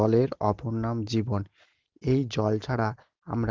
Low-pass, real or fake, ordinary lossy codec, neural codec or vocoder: 7.2 kHz; real; Opus, 16 kbps; none